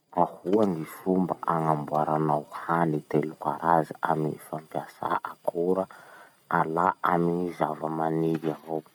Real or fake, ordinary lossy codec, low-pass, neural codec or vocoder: real; none; none; none